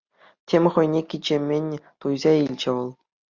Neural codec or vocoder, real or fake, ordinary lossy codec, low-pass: none; real; Opus, 64 kbps; 7.2 kHz